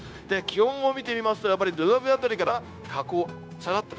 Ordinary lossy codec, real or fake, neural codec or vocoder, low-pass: none; fake; codec, 16 kHz, 0.9 kbps, LongCat-Audio-Codec; none